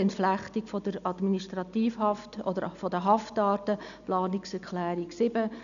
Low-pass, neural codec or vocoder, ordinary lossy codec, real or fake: 7.2 kHz; none; none; real